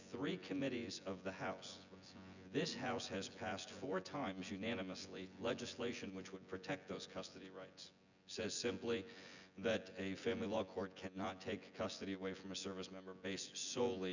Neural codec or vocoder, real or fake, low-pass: vocoder, 24 kHz, 100 mel bands, Vocos; fake; 7.2 kHz